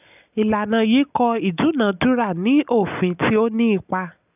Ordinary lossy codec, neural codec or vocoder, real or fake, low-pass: none; none; real; 3.6 kHz